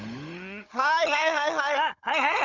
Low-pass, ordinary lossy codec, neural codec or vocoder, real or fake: 7.2 kHz; none; codec, 16 kHz, 16 kbps, FunCodec, trained on Chinese and English, 50 frames a second; fake